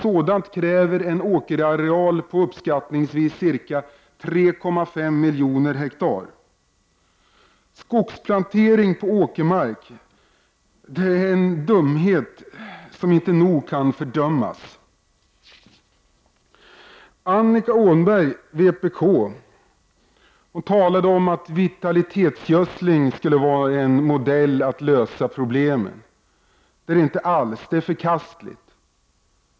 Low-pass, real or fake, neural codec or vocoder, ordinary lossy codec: none; real; none; none